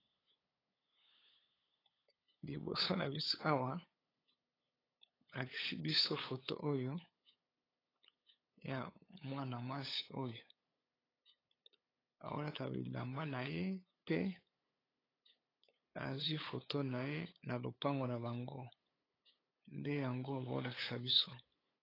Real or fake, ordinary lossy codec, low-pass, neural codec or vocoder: fake; AAC, 24 kbps; 5.4 kHz; codec, 16 kHz, 8 kbps, FunCodec, trained on LibriTTS, 25 frames a second